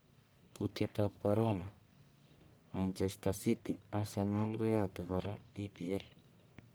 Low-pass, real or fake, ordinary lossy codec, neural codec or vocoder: none; fake; none; codec, 44.1 kHz, 1.7 kbps, Pupu-Codec